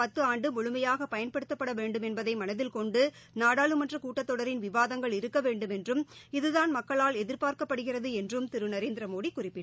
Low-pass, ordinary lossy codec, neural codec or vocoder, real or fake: none; none; none; real